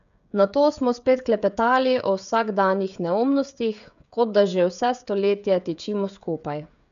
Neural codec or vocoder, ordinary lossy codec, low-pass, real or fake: codec, 16 kHz, 16 kbps, FreqCodec, smaller model; none; 7.2 kHz; fake